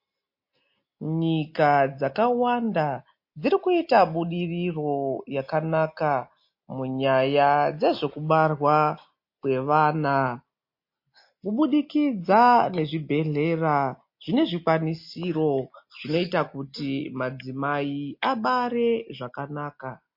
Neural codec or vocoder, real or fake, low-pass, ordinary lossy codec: none; real; 5.4 kHz; MP3, 32 kbps